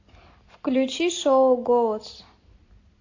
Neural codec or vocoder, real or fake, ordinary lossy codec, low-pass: none; real; AAC, 48 kbps; 7.2 kHz